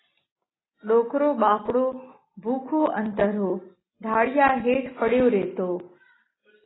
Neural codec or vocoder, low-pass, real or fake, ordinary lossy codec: none; 7.2 kHz; real; AAC, 16 kbps